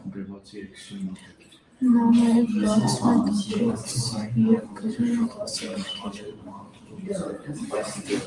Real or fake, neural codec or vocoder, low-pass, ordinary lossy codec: fake; vocoder, 44.1 kHz, 128 mel bands every 512 samples, BigVGAN v2; 10.8 kHz; Opus, 32 kbps